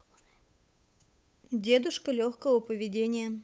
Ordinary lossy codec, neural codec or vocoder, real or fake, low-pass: none; codec, 16 kHz, 8 kbps, FunCodec, trained on Chinese and English, 25 frames a second; fake; none